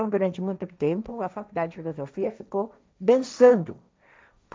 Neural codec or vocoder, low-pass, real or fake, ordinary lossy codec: codec, 16 kHz, 1.1 kbps, Voila-Tokenizer; none; fake; none